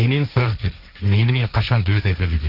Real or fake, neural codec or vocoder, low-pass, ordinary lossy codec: fake; codec, 16 kHz, 1.1 kbps, Voila-Tokenizer; 5.4 kHz; Opus, 64 kbps